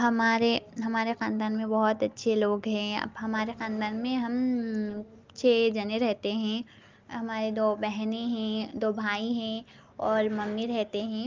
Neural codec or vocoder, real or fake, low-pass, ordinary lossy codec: none; real; 7.2 kHz; Opus, 32 kbps